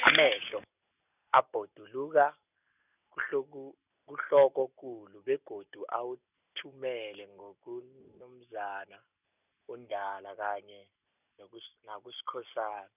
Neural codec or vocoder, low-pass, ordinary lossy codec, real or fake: none; 3.6 kHz; none; real